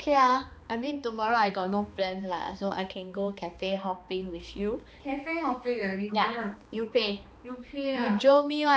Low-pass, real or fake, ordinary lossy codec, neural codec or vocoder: none; fake; none; codec, 16 kHz, 2 kbps, X-Codec, HuBERT features, trained on balanced general audio